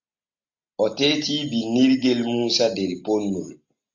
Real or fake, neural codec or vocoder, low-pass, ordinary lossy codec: real; none; 7.2 kHz; MP3, 48 kbps